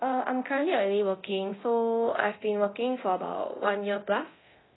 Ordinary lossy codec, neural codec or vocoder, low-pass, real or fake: AAC, 16 kbps; codec, 24 kHz, 0.9 kbps, DualCodec; 7.2 kHz; fake